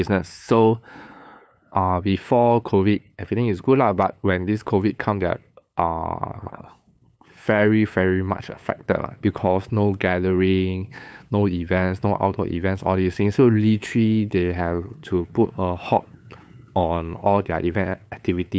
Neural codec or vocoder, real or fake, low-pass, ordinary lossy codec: codec, 16 kHz, 8 kbps, FunCodec, trained on LibriTTS, 25 frames a second; fake; none; none